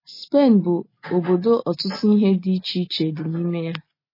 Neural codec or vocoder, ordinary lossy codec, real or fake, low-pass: none; MP3, 24 kbps; real; 5.4 kHz